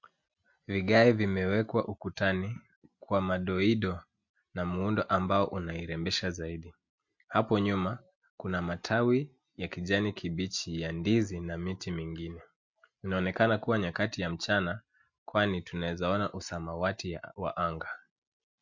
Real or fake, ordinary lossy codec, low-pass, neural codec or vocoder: real; MP3, 48 kbps; 7.2 kHz; none